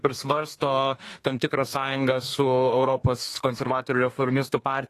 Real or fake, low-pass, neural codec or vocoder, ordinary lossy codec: fake; 14.4 kHz; codec, 32 kHz, 1.9 kbps, SNAC; AAC, 48 kbps